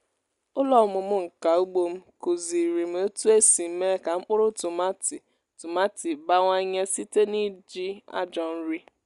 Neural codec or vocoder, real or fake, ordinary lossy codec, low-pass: none; real; Opus, 64 kbps; 10.8 kHz